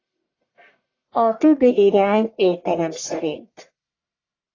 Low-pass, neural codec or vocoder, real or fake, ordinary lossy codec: 7.2 kHz; codec, 44.1 kHz, 1.7 kbps, Pupu-Codec; fake; AAC, 32 kbps